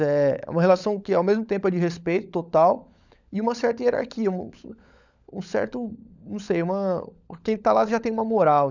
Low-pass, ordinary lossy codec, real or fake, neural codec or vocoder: 7.2 kHz; none; fake; codec, 16 kHz, 16 kbps, FunCodec, trained on LibriTTS, 50 frames a second